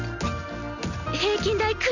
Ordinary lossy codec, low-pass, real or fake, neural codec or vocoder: none; 7.2 kHz; real; none